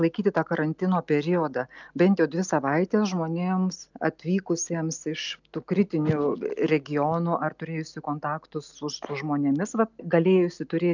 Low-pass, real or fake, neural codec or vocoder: 7.2 kHz; real; none